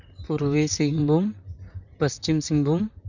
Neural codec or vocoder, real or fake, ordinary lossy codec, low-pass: vocoder, 44.1 kHz, 128 mel bands, Pupu-Vocoder; fake; none; 7.2 kHz